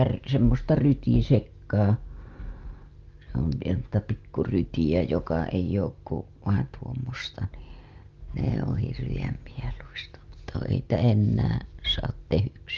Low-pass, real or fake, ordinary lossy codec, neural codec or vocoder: 7.2 kHz; real; Opus, 32 kbps; none